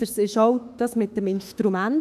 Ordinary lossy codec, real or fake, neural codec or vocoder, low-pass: none; fake; autoencoder, 48 kHz, 32 numbers a frame, DAC-VAE, trained on Japanese speech; 14.4 kHz